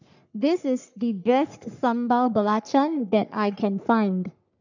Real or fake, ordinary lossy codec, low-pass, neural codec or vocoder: fake; none; 7.2 kHz; codec, 44.1 kHz, 3.4 kbps, Pupu-Codec